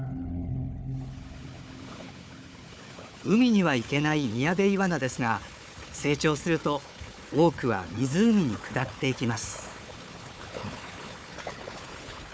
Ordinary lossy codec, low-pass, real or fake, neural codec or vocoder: none; none; fake; codec, 16 kHz, 4 kbps, FunCodec, trained on Chinese and English, 50 frames a second